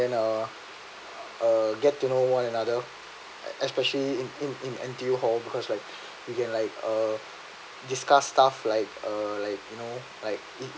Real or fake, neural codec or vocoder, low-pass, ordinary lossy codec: real; none; none; none